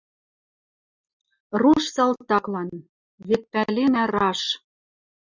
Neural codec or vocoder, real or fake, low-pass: none; real; 7.2 kHz